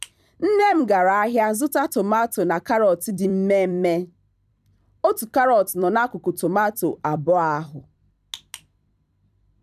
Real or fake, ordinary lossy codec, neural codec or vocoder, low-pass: fake; none; vocoder, 44.1 kHz, 128 mel bands every 256 samples, BigVGAN v2; 14.4 kHz